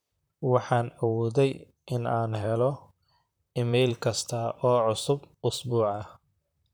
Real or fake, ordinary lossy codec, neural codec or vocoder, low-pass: fake; none; vocoder, 44.1 kHz, 128 mel bands, Pupu-Vocoder; none